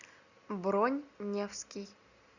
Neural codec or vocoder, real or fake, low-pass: none; real; 7.2 kHz